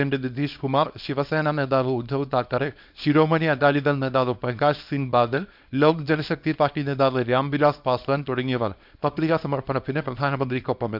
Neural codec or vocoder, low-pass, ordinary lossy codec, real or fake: codec, 24 kHz, 0.9 kbps, WavTokenizer, small release; 5.4 kHz; none; fake